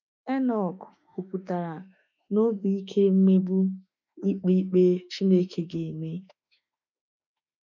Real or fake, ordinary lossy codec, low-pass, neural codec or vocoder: fake; none; 7.2 kHz; autoencoder, 48 kHz, 32 numbers a frame, DAC-VAE, trained on Japanese speech